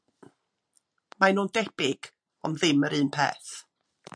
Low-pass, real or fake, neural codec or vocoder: 9.9 kHz; real; none